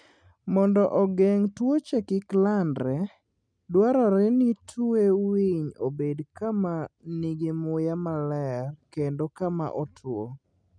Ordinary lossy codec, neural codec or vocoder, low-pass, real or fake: none; none; 9.9 kHz; real